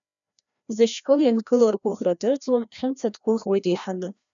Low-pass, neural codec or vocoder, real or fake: 7.2 kHz; codec, 16 kHz, 1 kbps, FreqCodec, larger model; fake